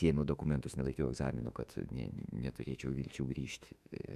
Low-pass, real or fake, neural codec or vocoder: 14.4 kHz; fake; autoencoder, 48 kHz, 32 numbers a frame, DAC-VAE, trained on Japanese speech